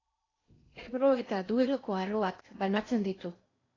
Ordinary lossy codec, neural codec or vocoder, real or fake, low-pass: AAC, 32 kbps; codec, 16 kHz in and 24 kHz out, 0.8 kbps, FocalCodec, streaming, 65536 codes; fake; 7.2 kHz